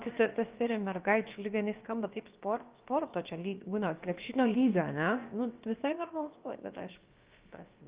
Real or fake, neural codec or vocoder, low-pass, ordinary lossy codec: fake; codec, 16 kHz, about 1 kbps, DyCAST, with the encoder's durations; 3.6 kHz; Opus, 32 kbps